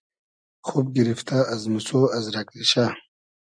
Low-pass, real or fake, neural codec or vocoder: 9.9 kHz; real; none